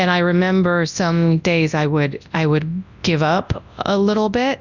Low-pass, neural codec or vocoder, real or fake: 7.2 kHz; codec, 24 kHz, 0.9 kbps, WavTokenizer, large speech release; fake